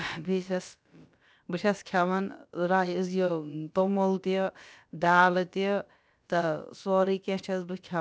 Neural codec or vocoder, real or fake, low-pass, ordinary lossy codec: codec, 16 kHz, about 1 kbps, DyCAST, with the encoder's durations; fake; none; none